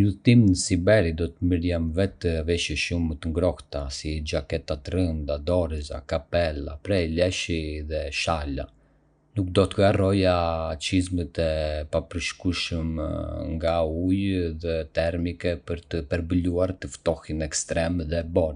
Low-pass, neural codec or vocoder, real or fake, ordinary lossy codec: 9.9 kHz; none; real; none